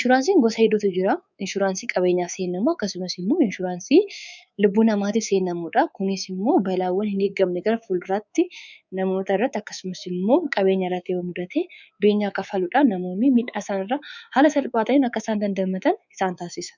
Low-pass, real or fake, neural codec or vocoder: 7.2 kHz; fake; codec, 24 kHz, 3.1 kbps, DualCodec